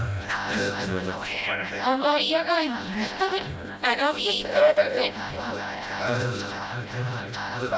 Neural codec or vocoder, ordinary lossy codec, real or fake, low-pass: codec, 16 kHz, 0.5 kbps, FreqCodec, smaller model; none; fake; none